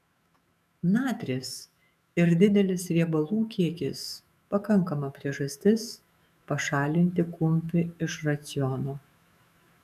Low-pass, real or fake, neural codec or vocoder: 14.4 kHz; fake; autoencoder, 48 kHz, 128 numbers a frame, DAC-VAE, trained on Japanese speech